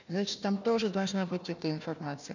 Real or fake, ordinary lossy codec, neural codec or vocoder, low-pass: fake; none; codec, 16 kHz, 1 kbps, FunCodec, trained on Chinese and English, 50 frames a second; 7.2 kHz